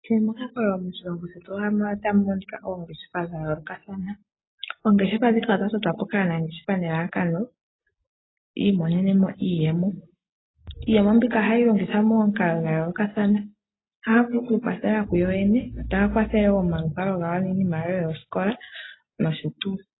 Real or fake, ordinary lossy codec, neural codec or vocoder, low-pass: real; AAC, 16 kbps; none; 7.2 kHz